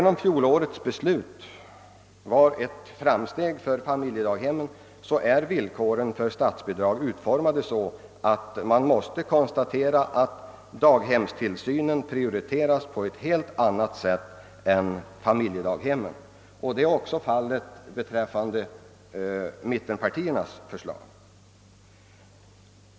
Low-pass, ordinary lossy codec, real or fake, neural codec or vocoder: none; none; real; none